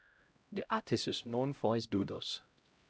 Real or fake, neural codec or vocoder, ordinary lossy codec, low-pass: fake; codec, 16 kHz, 0.5 kbps, X-Codec, HuBERT features, trained on LibriSpeech; none; none